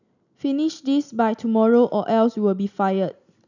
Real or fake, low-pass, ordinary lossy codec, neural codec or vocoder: real; 7.2 kHz; none; none